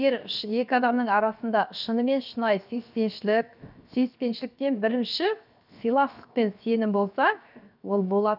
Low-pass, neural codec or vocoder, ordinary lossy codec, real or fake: 5.4 kHz; codec, 16 kHz, 0.7 kbps, FocalCodec; none; fake